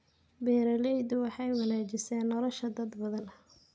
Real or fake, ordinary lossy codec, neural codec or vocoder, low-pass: real; none; none; none